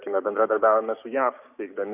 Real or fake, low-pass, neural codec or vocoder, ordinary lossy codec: fake; 3.6 kHz; codec, 16 kHz, 6 kbps, DAC; AAC, 32 kbps